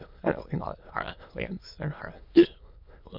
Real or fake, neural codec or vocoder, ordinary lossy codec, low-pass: fake; autoencoder, 22.05 kHz, a latent of 192 numbers a frame, VITS, trained on many speakers; MP3, 48 kbps; 5.4 kHz